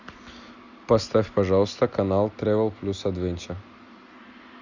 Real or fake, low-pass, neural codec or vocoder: real; 7.2 kHz; none